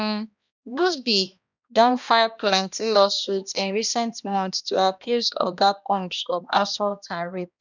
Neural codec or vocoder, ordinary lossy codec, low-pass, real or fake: codec, 16 kHz, 1 kbps, X-Codec, HuBERT features, trained on balanced general audio; none; 7.2 kHz; fake